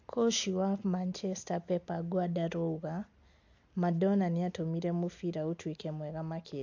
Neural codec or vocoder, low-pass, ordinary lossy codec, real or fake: none; 7.2 kHz; MP3, 48 kbps; real